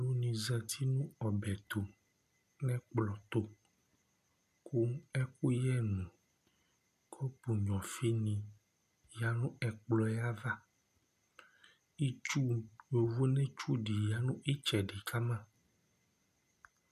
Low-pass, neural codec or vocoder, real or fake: 14.4 kHz; none; real